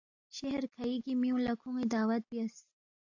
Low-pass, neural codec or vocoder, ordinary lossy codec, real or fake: 7.2 kHz; none; AAC, 48 kbps; real